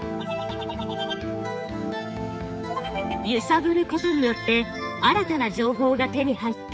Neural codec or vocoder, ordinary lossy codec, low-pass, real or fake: codec, 16 kHz, 4 kbps, X-Codec, HuBERT features, trained on general audio; none; none; fake